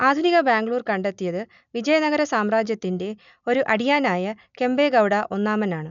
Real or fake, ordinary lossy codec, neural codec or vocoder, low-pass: real; none; none; 7.2 kHz